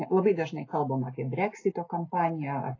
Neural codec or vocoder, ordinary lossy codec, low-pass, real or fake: none; AAC, 32 kbps; 7.2 kHz; real